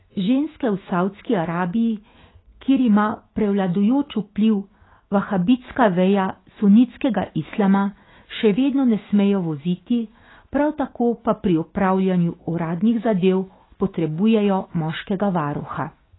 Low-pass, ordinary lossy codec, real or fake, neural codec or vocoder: 7.2 kHz; AAC, 16 kbps; fake; autoencoder, 48 kHz, 128 numbers a frame, DAC-VAE, trained on Japanese speech